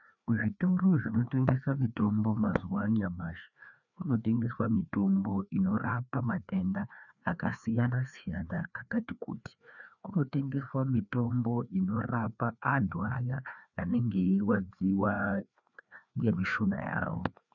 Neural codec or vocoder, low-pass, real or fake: codec, 16 kHz, 2 kbps, FreqCodec, larger model; 7.2 kHz; fake